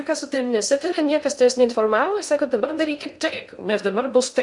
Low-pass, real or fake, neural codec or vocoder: 10.8 kHz; fake; codec, 16 kHz in and 24 kHz out, 0.6 kbps, FocalCodec, streaming, 2048 codes